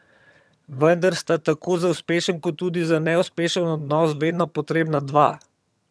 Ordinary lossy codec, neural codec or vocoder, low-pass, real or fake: none; vocoder, 22.05 kHz, 80 mel bands, HiFi-GAN; none; fake